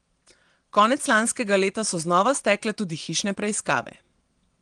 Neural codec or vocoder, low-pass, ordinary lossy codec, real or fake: vocoder, 22.05 kHz, 80 mel bands, WaveNeXt; 9.9 kHz; Opus, 24 kbps; fake